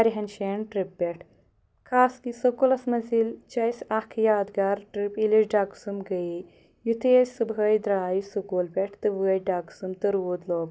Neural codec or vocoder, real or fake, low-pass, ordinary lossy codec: none; real; none; none